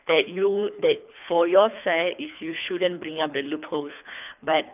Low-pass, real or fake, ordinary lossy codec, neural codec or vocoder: 3.6 kHz; fake; none; codec, 24 kHz, 3 kbps, HILCodec